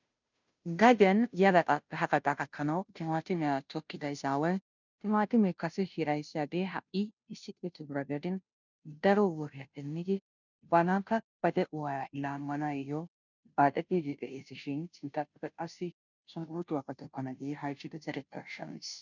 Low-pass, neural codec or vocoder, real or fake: 7.2 kHz; codec, 16 kHz, 0.5 kbps, FunCodec, trained on Chinese and English, 25 frames a second; fake